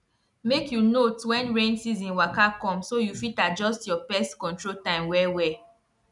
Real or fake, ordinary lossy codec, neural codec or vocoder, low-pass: real; none; none; 10.8 kHz